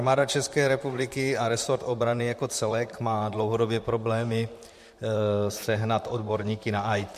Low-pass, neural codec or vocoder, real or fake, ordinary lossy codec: 14.4 kHz; vocoder, 44.1 kHz, 128 mel bands, Pupu-Vocoder; fake; MP3, 64 kbps